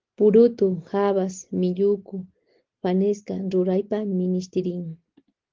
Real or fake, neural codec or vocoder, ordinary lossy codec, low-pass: real; none; Opus, 16 kbps; 7.2 kHz